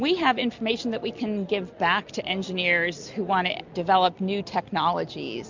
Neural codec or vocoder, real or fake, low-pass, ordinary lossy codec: none; real; 7.2 kHz; MP3, 64 kbps